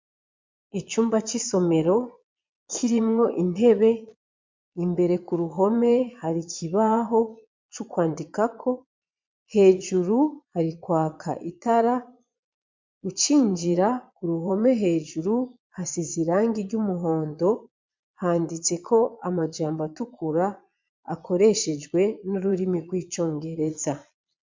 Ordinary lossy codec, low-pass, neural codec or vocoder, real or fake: MP3, 64 kbps; 7.2 kHz; vocoder, 22.05 kHz, 80 mel bands, Vocos; fake